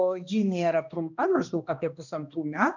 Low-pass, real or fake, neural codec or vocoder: 7.2 kHz; fake; codec, 16 kHz, 1 kbps, X-Codec, HuBERT features, trained on balanced general audio